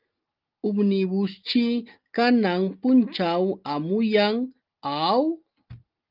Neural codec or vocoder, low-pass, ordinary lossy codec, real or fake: none; 5.4 kHz; Opus, 24 kbps; real